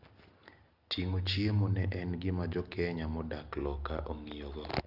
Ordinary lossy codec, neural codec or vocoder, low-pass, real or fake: Opus, 32 kbps; none; 5.4 kHz; real